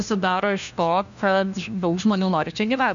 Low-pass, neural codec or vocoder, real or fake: 7.2 kHz; codec, 16 kHz, 1 kbps, FunCodec, trained on LibriTTS, 50 frames a second; fake